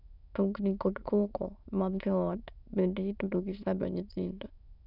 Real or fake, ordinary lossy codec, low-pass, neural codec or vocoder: fake; none; 5.4 kHz; autoencoder, 22.05 kHz, a latent of 192 numbers a frame, VITS, trained on many speakers